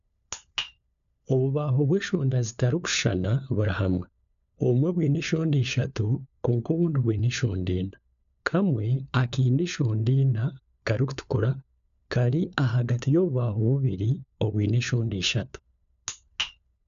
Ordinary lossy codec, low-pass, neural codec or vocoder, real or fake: AAC, 96 kbps; 7.2 kHz; codec, 16 kHz, 4 kbps, FunCodec, trained on LibriTTS, 50 frames a second; fake